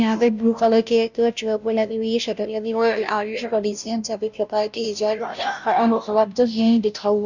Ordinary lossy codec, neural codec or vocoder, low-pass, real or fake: none; codec, 16 kHz, 0.5 kbps, FunCodec, trained on Chinese and English, 25 frames a second; 7.2 kHz; fake